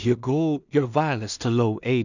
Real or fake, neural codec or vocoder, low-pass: fake; codec, 16 kHz in and 24 kHz out, 0.4 kbps, LongCat-Audio-Codec, two codebook decoder; 7.2 kHz